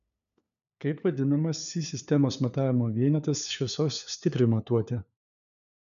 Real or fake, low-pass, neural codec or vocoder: fake; 7.2 kHz; codec, 16 kHz, 4 kbps, FunCodec, trained on LibriTTS, 50 frames a second